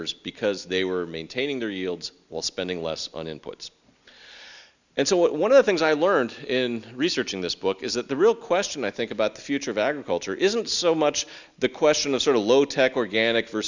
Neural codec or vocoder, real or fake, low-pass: none; real; 7.2 kHz